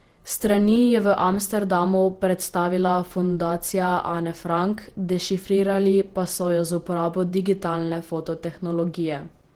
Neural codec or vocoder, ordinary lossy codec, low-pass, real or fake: vocoder, 48 kHz, 128 mel bands, Vocos; Opus, 16 kbps; 19.8 kHz; fake